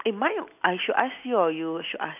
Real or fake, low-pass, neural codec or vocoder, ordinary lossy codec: real; 3.6 kHz; none; none